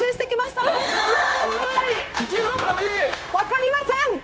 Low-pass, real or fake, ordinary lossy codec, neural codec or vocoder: none; fake; none; codec, 16 kHz, 0.9 kbps, LongCat-Audio-Codec